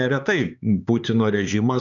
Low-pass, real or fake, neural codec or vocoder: 7.2 kHz; fake; codec, 16 kHz, 4 kbps, X-Codec, HuBERT features, trained on LibriSpeech